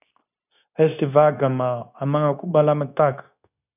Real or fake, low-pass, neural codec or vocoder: fake; 3.6 kHz; codec, 16 kHz, 0.9 kbps, LongCat-Audio-Codec